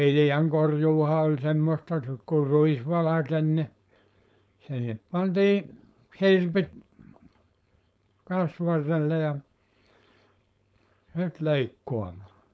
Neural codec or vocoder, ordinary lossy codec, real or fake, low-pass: codec, 16 kHz, 4.8 kbps, FACodec; none; fake; none